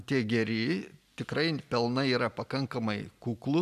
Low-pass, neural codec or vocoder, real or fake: 14.4 kHz; none; real